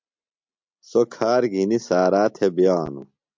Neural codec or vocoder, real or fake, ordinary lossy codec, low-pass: none; real; MP3, 64 kbps; 7.2 kHz